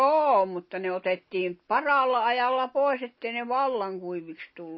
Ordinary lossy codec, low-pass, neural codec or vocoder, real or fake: MP3, 24 kbps; 7.2 kHz; none; real